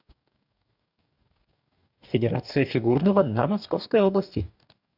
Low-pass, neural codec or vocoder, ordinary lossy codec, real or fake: 5.4 kHz; codec, 44.1 kHz, 2.6 kbps, DAC; none; fake